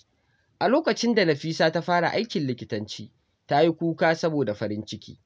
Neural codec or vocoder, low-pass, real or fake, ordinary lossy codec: none; none; real; none